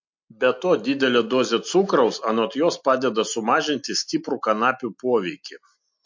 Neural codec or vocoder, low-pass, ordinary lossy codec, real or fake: none; 7.2 kHz; MP3, 48 kbps; real